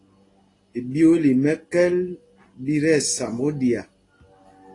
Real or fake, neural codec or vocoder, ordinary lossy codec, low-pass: real; none; AAC, 32 kbps; 10.8 kHz